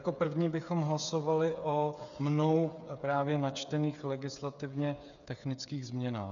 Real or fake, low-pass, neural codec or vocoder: fake; 7.2 kHz; codec, 16 kHz, 8 kbps, FreqCodec, smaller model